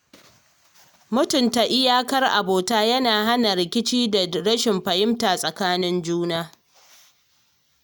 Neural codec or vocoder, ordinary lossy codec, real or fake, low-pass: none; none; real; none